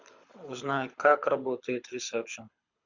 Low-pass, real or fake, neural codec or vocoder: 7.2 kHz; fake; codec, 24 kHz, 6 kbps, HILCodec